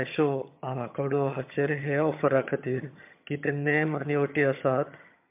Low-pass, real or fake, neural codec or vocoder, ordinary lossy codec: 3.6 kHz; fake; vocoder, 22.05 kHz, 80 mel bands, HiFi-GAN; MP3, 32 kbps